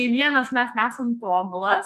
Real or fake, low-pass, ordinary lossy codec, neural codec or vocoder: fake; 14.4 kHz; MP3, 96 kbps; codec, 44.1 kHz, 2.6 kbps, SNAC